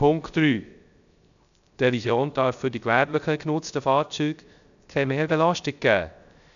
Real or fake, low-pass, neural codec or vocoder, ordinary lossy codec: fake; 7.2 kHz; codec, 16 kHz, 0.3 kbps, FocalCodec; none